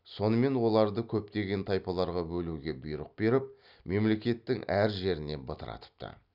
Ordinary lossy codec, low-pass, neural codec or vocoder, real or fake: none; 5.4 kHz; none; real